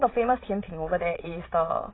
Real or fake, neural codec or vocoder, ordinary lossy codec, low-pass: fake; vocoder, 22.05 kHz, 80 mel bands, Vocos; AAC, 16 kbps; 7.2 kHz